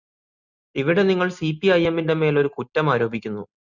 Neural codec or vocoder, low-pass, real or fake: none; 7.2 kHz; real